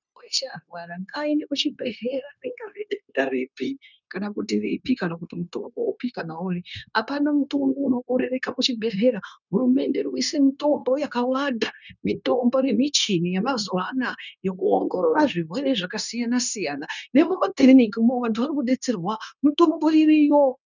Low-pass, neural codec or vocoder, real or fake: 7.2 kHz; codec, 16 kHz, 0.9 kbps, LongCat-Audio-Codec; fake